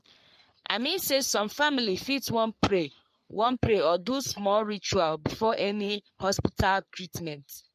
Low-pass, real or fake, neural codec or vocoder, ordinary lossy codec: 14.4 kHz; fake; codec, 44.1 kHz, 3.4 kbps, Pupu-Codec; MP3, 64 kbps